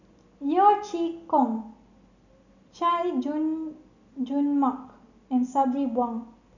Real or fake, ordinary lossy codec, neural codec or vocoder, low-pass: real; none; none; 7.2 kHz